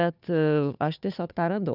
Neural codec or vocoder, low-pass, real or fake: codec, 16 kHz, 2 kbps, FunCodec, trained on Chinese and English, 25 frames a second; 5.4 kHz; fake